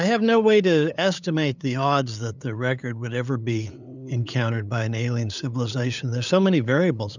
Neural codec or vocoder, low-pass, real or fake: codec, 16 kHz, 8 kbps, FunCodec, trained on LibriTTS, 25 frames a second; 7.2 kHz; fake